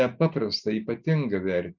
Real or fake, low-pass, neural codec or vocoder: real; 7.2 kHz; none